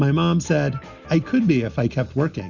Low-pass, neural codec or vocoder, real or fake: 7.2 kHz; none; real